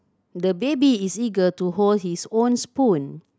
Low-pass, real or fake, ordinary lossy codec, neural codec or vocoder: none; real; none; none